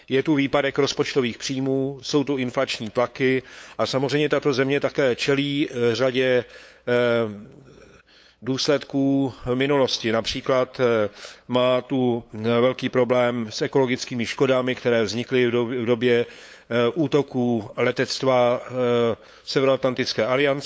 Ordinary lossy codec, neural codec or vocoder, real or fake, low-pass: none; codec, 16 kHz, 8 kbps, FunCodec, trained on LibriTTS, 25 frames a second; fake; none